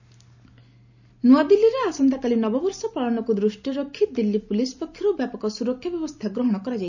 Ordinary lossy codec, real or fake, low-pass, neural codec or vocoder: none; real; 7.2 kHz; none